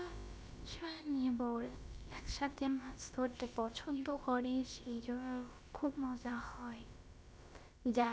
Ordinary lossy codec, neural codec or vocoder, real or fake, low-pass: none; codec, 16 kHz, about 1 kbps, DyCAST, with the encoder's durations; fake; none